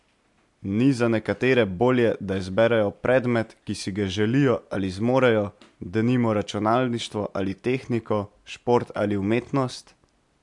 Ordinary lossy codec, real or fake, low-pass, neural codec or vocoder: MP3, 64 kbps; real; 10.8 kHz; none